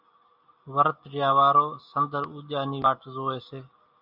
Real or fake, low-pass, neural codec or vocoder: real; 5.4 kHz; none